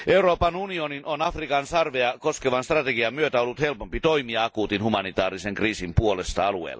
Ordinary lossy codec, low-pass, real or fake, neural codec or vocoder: none; none; real; none